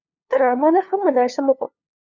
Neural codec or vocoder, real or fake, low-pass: codec, 16 kHz, 2 kbps, FunCodec, trained on LibriTTS, 25 frames a second; fake; 7.2 kHz